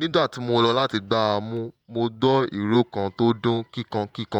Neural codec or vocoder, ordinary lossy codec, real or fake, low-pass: vocoder, 48 kHz, 128 mel bands, Vocos; none; fake; 19.8 kHz